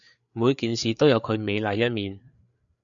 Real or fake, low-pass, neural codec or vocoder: fake; 7.2 kHz; codec, 16 kHz, 4 kbps, FreqCodec, larger model